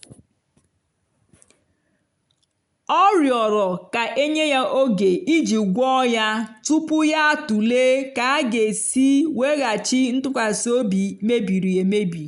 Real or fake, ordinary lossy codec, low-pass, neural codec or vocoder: real; none; 10.8 kHz; none